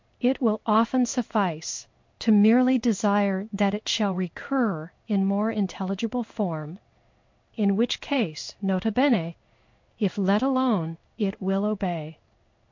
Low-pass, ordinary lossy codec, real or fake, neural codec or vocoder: 7.2 kHz; MP3, 48 kbps; fake; codec, 16 kHz in and 24 kHz out, 1 kbps, XY-Tokenizer